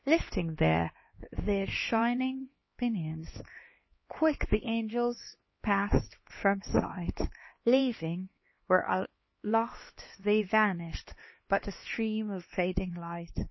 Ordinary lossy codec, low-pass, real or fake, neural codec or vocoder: MP3, 24 kbps; 7.2 kHz; fake; codec, 16 kHz, 2 kbps, X-Codec, HuBERT features, trained on LibriSpeech